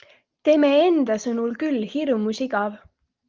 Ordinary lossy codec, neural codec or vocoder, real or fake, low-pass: Opus, 24 kbps; none; real; 7.2 kHz